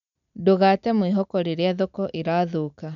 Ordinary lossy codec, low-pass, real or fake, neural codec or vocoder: none; 7.2 kHz; real; none